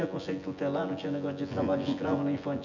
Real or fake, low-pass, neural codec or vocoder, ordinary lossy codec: fake; 7.2 kHz; vocoder, 24 kHz, 100 mel bands, Vocos; none